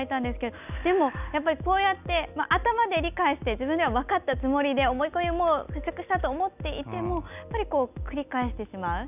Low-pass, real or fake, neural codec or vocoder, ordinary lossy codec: 3.6 kHz; real; none; none